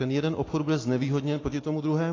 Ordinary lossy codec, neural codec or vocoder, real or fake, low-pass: AAC, 32 kbps; none; real; 7.2 kHz